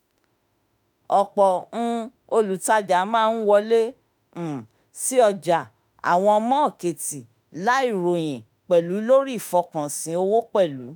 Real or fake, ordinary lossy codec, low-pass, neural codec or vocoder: fake; none; none; autoencoder, 48 kHz, 32 numbers a frame, DAC-VAE, trained on Japanese speech